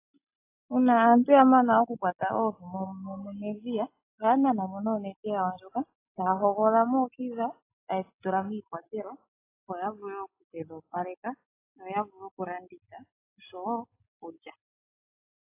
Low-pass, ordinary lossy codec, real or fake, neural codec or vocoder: 3.6 kHz; AAC, 24 kbps; real; none